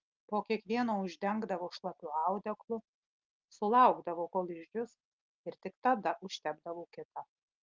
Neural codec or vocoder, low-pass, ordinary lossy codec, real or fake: none; 7.2 kHz; Opus, 24 kbps; real